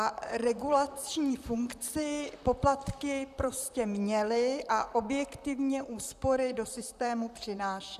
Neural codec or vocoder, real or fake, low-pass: vocoder, 44.1 kHz, 128 mel bands, Pupu-Vocoder; fake; 14.4 kHz